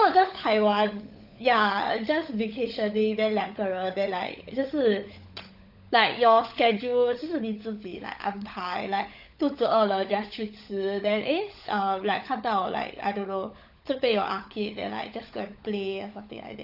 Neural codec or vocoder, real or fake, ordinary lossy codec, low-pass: codec, 16 kHz, 16 kbps, FunCodec, trained on LibriTTS, 50 frames a second; fake; none; 5.4 kHz